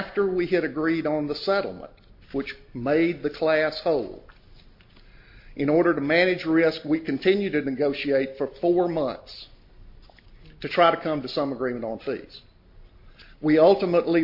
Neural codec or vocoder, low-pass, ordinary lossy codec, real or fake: none; 5.4 kHz; MP3, 32 kbps; real